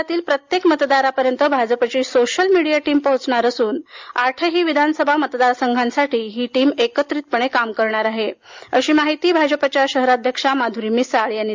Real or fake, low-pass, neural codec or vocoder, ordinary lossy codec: real; 7.2 kHz; none; MP3, 64 kbps